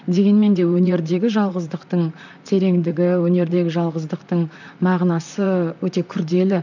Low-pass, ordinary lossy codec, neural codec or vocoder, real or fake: 7.2 kHz; none; vocoder, 44.1 kHz, 128 mel bands, Pupu-Vocoder; fake